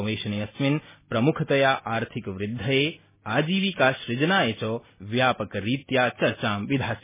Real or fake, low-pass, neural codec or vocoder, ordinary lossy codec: real; 3.6 kHz; none; MP3, 16 kbps